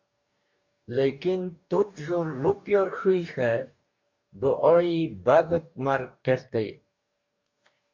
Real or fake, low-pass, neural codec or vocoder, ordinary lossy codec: fake; 7.2 kHz; codec, 44.1 kHz, 2.6 kbps, DAC; MP3, 64 kbps